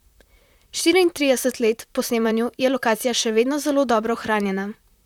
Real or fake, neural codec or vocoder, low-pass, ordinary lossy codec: fake; vocoder, 44.1 kHz, 128 mel bands, Pupu-Vocoder; 19.8 kHz; none